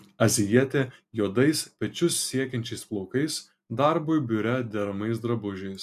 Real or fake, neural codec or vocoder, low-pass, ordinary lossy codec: real; none; 14.4 kHz; MP3, 96 kbps